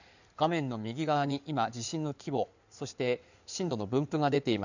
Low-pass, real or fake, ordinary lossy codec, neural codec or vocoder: 7.2 kHz; fake; none; codec, 16 kHz in and 24 kHz out, 2.2 kbps, FireRedTTS-2 codec